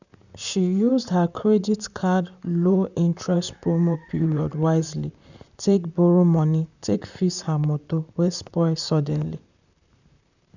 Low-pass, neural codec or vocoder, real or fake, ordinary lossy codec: 7.2 kHz; vocoder, 44.1 kHz, 128 mel bands, Pupu-Vocoder; fake; none